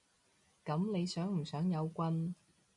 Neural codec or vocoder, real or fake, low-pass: none; real; 10.8 kHz